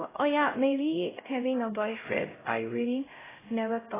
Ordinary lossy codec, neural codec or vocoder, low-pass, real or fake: AAC, 16 kbps; codec, 16 kHz, 0.5 kbps, X-Codec, HuBERT features, trained on LibriSpeech; 3.6 kHz; fake